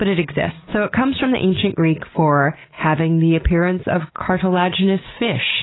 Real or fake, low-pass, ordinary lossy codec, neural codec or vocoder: real; 7.2 kHz; AAC, 16 kbps; none